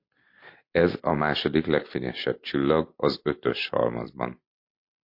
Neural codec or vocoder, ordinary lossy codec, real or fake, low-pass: none; MP3, 32 kbps; real; 5.4 kHz